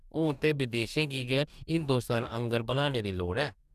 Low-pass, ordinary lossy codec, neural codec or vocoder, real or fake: 14.4 kHz; none; codec, 44.1 kHz, 2.6 kbps, DAC; fake